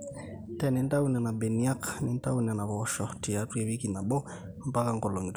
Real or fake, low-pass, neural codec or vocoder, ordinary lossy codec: real; none; none; none